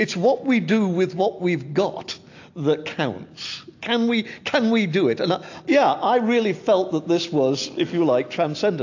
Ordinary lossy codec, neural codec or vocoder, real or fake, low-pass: AAC, 48 kbps; none; real; 7.2 kHz